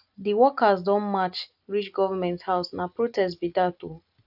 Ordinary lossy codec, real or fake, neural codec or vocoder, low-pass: AAC, 48 kbps; real; none; 5.4 kHz